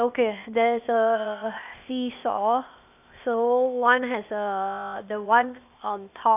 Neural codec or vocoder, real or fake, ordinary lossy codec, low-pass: codec, 16 kHz, 0.8 kbps, ZipCodec; fake; none; 3.6 kHz